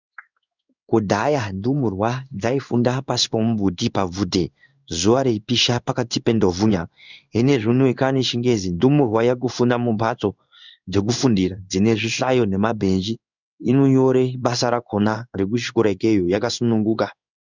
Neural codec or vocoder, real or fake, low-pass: codec, 16 kHz in and 24 kHz out, 1 kbps, XY-Tokenizer; fake; 7.2 kHz